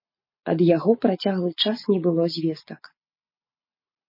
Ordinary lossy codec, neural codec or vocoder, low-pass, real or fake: MP3, 24 kbps; none; 5.4 kHz; real